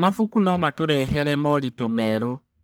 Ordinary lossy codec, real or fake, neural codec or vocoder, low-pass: none; fake; codec, 44.1 kHz, 1.7 kbps, Pupu-Codec; none